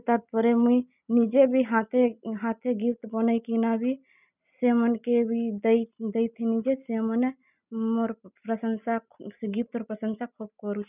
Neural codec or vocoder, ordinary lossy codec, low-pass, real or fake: none; none; 3.6 kHz; real